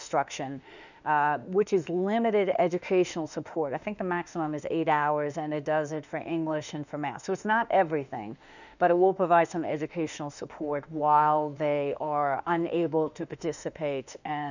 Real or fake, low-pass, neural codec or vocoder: fake; 7.2 kHz; autoencoder, 48 kHz, 32 numbers a frame, DAC-VAE, trained on Japanese speech